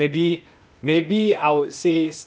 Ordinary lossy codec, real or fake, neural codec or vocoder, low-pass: none; fake; codec, 16 kHz, 0.8 kbps, ZipCodec; none